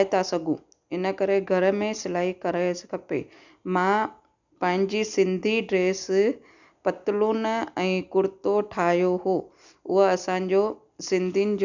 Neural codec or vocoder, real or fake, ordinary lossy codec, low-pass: none; real; none; 7.2 kHz